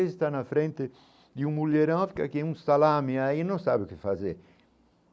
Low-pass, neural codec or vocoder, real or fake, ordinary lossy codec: none; none; real; none